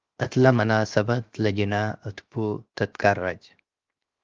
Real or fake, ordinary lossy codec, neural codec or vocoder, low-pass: fake; Opus, 32 kbps; codec, 16 kHz, 0.7 kbps, FocalCodec; 7.2 kHz